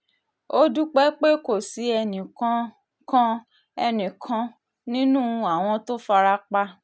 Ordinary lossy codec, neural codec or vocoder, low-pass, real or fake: none; none; none; real